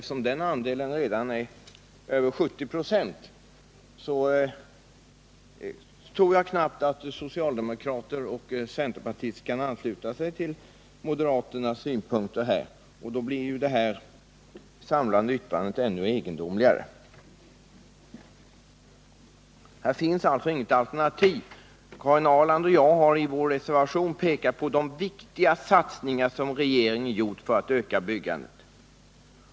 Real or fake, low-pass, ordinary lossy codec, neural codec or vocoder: real; none; none; none